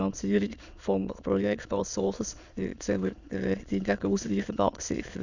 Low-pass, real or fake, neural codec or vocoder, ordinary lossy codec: 7.2 kHz; fake; autoencoder, 22.05 kHz, a latent of 192 numbers a frame, VITS, trained on many speakers; none